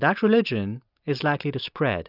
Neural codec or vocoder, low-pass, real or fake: none; 5.4 kHz; real